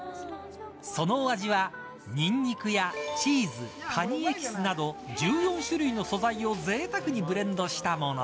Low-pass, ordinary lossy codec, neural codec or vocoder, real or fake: none; none; none; real